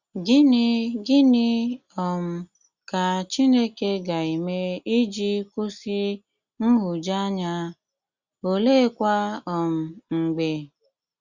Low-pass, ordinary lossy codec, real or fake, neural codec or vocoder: 7.2 kHz; Opus, 64 kbps; real; none